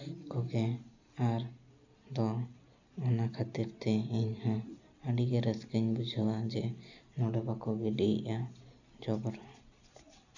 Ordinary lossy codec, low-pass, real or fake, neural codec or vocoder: none; 7.2 kHz; real; none